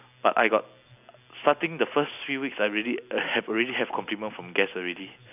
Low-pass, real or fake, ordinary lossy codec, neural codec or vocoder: 3.6 kHz; real; none; none